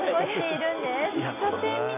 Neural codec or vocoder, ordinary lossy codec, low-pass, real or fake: none; AAC, 32 kbps; 3.6 kHz; real